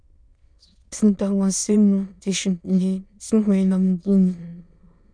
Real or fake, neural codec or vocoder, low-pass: fake; autoencoder, 22.05 kHz, a latent of 192 numbers a frame, VITS, trained on many speakers; 9.9 kHz